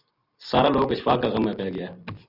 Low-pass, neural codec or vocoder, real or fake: 5.4 kHz; none; real